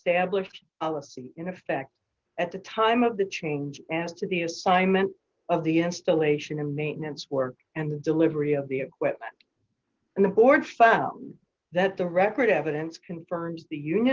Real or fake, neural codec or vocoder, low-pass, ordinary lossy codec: real; none; 7.2 kHz; Opus, 16 kbps